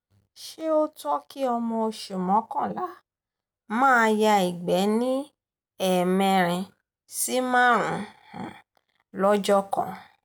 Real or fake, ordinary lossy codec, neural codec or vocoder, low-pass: real; none; none; none